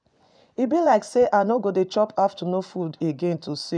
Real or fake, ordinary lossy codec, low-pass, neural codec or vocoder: fake; none; 9.9 kHz; vocoder, 24 kHz, 100 mel bands, Vocos